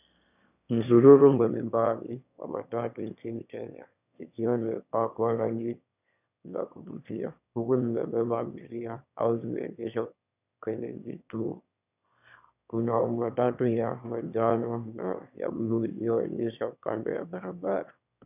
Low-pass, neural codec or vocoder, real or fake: 3.6 kHz; autoencoder, 22.05 kHz, a latent of 192 numbers a frame, VITS, trained on one speaker; fake